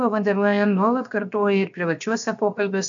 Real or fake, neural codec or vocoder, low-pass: fake; codec, 16 kHz, 0.7 kbps, FocalCodec; 7.2 kHz